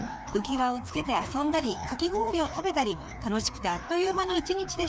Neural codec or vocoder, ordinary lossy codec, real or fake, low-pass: codec, 16 kHz, 2 kbps, FreqCodec, larger model; none; fake; none